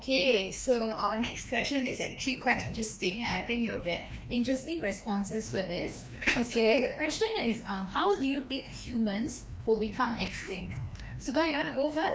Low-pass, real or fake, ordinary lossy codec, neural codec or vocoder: none; fake; none; codec, 16 kHz, 1 kbps, FreqCodec, larger model